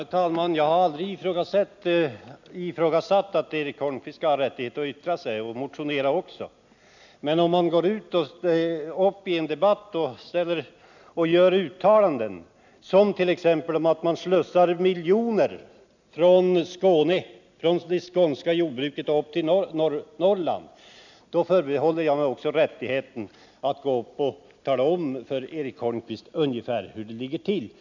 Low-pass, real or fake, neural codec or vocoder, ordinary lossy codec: 7.2 kHz; real; none; none